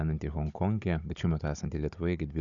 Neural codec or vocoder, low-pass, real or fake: codec, 16 kHz, 16 kbps, FunCodec, trained on Chinese and English, 50 frames a second; 7.2 kHz; fake